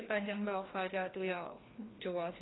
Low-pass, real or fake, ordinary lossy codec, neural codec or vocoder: 7.2 kHz; fake; AAC, 16 kbps; codec, 16 kHz, 1 kbps, FreqCodec, larger model